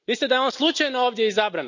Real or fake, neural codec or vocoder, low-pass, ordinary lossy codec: real; none; 7.2 kHz; none